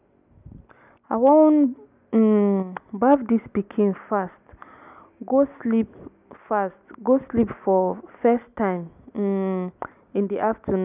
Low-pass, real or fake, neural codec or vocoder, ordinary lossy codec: 3.6 kHz; real; none; none